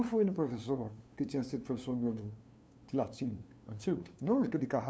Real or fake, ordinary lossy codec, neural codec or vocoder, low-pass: fake; none; codec, 16 kHz, 2 kbps, FunCodec, trained on LibriTTS, 25 frames a second; none